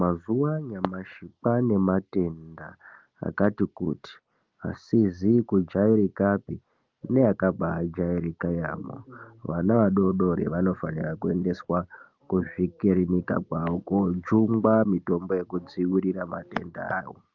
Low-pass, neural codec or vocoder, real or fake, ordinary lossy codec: 7.2 kHz; none; real; Opus, 32 kbps